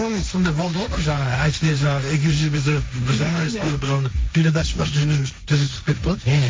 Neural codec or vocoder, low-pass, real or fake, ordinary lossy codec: codec, 16 kHz, 1.1 kbps, Voila-Tokenizer; none; fake; none